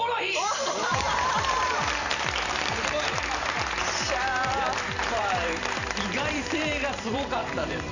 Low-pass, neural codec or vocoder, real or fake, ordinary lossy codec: 7.2 kHz; vocoder, 44.1 kHz, 128 mel bands every 512 samples, BigVGAN v2; fake; none